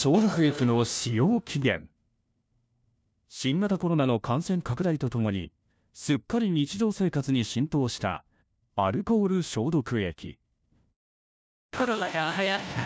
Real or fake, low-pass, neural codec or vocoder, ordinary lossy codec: fake; none; codec, 16 kHz, 1 kbps, FunCodec, trained on LibriTTS, 50 frames a second; none